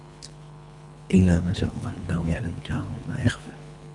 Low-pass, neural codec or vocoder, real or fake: 10.8 kHz; codec, 24 kHz, 3 kbps, HILCodec; fake